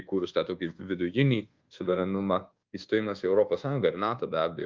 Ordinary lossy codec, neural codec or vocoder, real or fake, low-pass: Opus, 32 kbps; codec, 24 kHz, 1.2 kbps, DualCodec; fake; 7.2 kHz